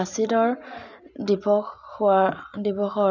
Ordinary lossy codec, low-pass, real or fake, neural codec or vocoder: none; 7.2 kHz; real; none